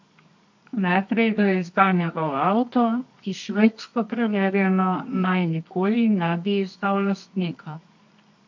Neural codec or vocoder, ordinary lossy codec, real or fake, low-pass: codec, 24 kHz, 0.9 kbps, WavTokenizer, medium music audio release; MP3, 48 kbps; fake; 7.2 kHz